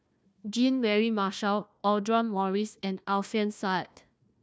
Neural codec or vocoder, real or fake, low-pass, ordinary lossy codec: codec, 16 kHz, 1 kbps, FunCodec, trained on Chinese and English, 50 frames a second; fake; none; none